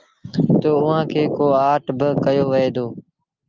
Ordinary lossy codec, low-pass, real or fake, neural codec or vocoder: Opus, 24 kbps; 7.2 kHz; real; none